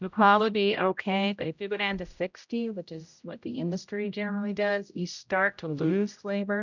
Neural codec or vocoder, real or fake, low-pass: codec, 16 kHz, 0.5 kbps, X-Codec, HuBERT features, trained on general audio; fake; 7.2 kHz